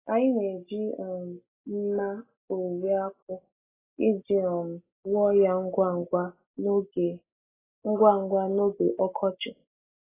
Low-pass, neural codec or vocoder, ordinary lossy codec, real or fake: 3.6 kHz; none; AAC, 16 kbps; real